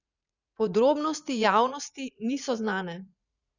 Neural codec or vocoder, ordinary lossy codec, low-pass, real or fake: vocoder, 44.1 kHz, 80 mel bands, Vocos; none; 7.2 kHz; fake